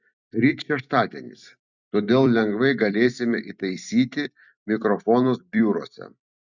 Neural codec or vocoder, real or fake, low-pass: vocoder, 44.1 kHz, 128 mel bands every 256 samples, BigVGAN v2; fake; 7.2 kHz